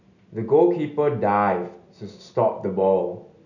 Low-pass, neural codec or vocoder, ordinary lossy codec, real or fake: 7.2 kHz; none; none; real